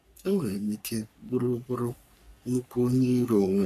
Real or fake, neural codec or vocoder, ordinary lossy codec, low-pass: fake; codec, 44.1 kHz, 3.4 kbps, Pupu-Codec; none; 14.4 kHz